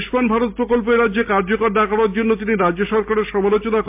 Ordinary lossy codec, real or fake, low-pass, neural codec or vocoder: MP3, 32 kbps; real; 3.6 kHz; none